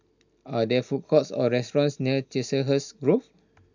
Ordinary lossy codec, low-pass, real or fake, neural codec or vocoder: none; 7.2 kHz; real; none